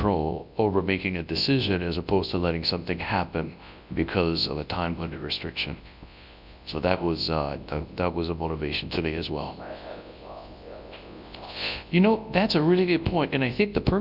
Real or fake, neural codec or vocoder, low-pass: fake; codec, 24 kHz, 0.9 kbps, WavTokenizer, large speech release; 5.4 kHz